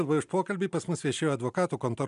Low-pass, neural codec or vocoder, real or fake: 10.8 kHz; none; real